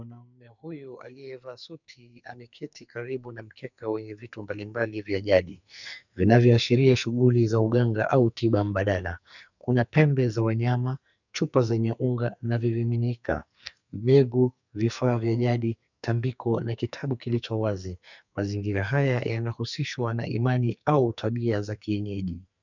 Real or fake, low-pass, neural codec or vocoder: fake; 7.2 kHz; codec, 44.1 kHz, 2.6 kbps, SNAC